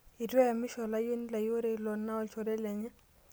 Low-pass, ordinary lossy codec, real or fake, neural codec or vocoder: none; none; real; none